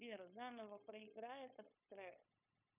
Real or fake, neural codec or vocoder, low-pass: fake; codec, 16 kHz, 0.9 kbps, LongCat-Audio-Codec; 3.6 kHz